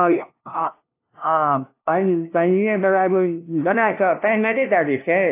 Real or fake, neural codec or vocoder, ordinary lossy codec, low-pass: fake; codec, 16 kHz, 0.5 kbps, FunCodec, trained on LibriTTS, 25 frames a second; AAC, 24 kbps; 3.6 kHz